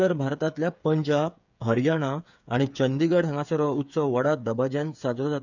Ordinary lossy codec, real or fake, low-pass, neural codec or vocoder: none; fake; 7.2 kHz; codec, 16 kHz, 8 kbps, FreqCodec, smaller model